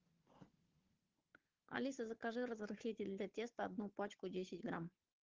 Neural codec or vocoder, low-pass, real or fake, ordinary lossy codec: codec, 16 kHz, 4 kbps, FunCodec, trained on Chinese and English, 50 frames a second; 7.2 kHz; fake; Opus, 16 kbps